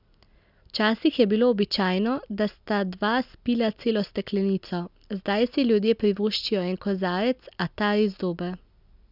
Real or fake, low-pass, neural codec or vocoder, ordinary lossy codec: real; 5.4 kHz; none; none